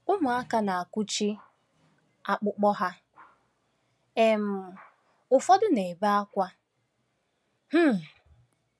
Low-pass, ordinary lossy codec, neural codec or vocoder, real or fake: none; none; none; real